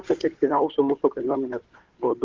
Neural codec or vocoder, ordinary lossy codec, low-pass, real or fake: codec, 16 kHz, 4 kbps, FunCodec, trained on Chinese and English, 50 frames a second; Opus, 16 kbps; 7.2 kHz; fake